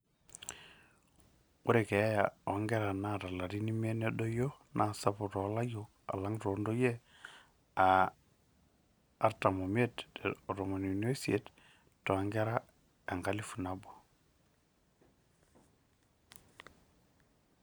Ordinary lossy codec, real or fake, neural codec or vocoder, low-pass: none; real; none; none